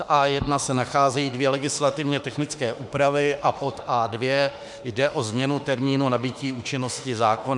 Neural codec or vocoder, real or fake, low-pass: autoencoder, 48 kHz, 32 numbers a frame, DAC-VAE, trained on Japanese speech; fake; 10.8 kHz